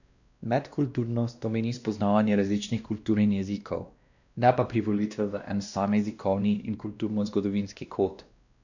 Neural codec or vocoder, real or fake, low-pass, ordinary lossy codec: codec, 16 kHz, 1 kbps, X-Codec, WavLM features, trained on Multilingual LibriSpeech; fake; 7.2 kHz; none